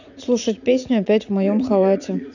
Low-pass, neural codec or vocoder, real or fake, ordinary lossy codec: 7.2 kHz; vocoder, 44.1 kHz, 80 mel bands, Vocos; fake; none